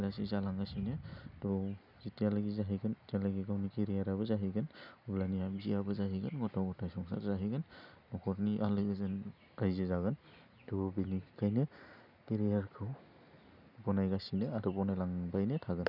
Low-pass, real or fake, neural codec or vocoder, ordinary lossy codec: 5.4 kHz; real; none; none